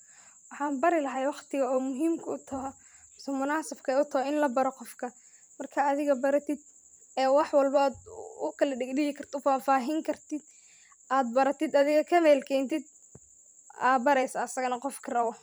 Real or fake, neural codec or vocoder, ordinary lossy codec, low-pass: fake; vocoder, 44.1 kHz, 128 mel bands every 512 samples, BigVGAN v2; none; none